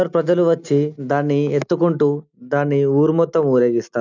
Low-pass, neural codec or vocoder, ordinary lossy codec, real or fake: 7.2 kHz; none; none; real